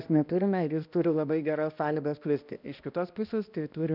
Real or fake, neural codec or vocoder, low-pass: fake; codec, 16 kHz, 1 kbps, X-Codec, HuBERT features, trained on balanced general audio; 5.4 kHz